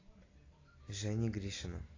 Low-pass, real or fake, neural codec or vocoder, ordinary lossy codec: 7.2 kHz; real; none; AAC, 32 kbps